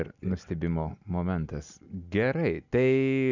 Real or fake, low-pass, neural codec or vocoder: real; 7.2 kHz; none